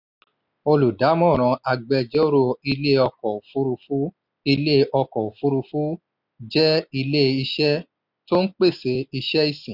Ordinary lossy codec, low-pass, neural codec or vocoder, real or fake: none; 5.4 kHz; none; real